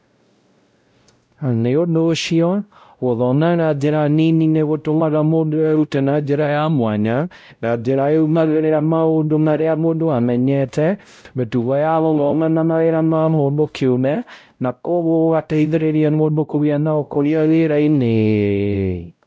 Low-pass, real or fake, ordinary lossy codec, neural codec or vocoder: none; fake; none; codec, 16 kHz, 0.5 kbps, X-Codec, WavLM features, trained on Multilingual LibriSpeech